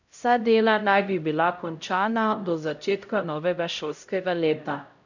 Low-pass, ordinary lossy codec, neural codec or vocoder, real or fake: 7.2 kHz; none; codec, 16 kHz, 0.5 kbps, X-Codec, HuBERT features, trained on LibriSpeech; fake